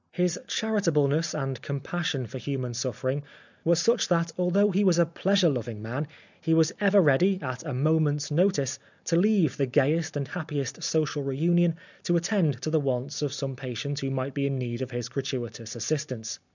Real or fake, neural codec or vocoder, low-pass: real; none; 7.2 kHz